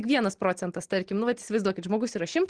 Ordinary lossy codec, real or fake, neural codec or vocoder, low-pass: Opus, 16 kbps; real; none; 9.9 kHz